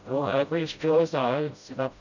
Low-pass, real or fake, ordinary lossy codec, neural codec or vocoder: 7.2 kHz; fake; none; codec, 16 kHz, 0.5 kbps, FreqCodec, smaller model